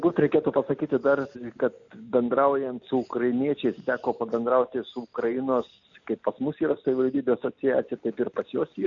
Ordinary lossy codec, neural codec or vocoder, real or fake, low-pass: MP3, 96 kbps; none; real; 7.2 kHz